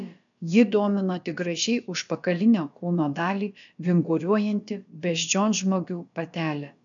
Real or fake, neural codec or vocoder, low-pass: fake; codec, 16 kHz, about 1 kbps, DyCAST, with the encoder's durations; 7.2 kHz